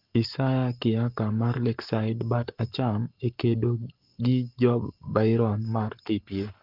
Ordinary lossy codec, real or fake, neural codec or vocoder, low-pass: Opus, 32 kbps; fake; codec, 44.1 kHz, 7.8 kbps, Pupu-Codec; 5.4 kHz